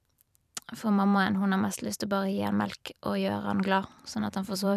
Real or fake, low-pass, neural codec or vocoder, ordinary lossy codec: fake; 14.4 kHz; vocoder, 44.1 kHz, 128 mel bands every 512 samples, BigVGAN v2; none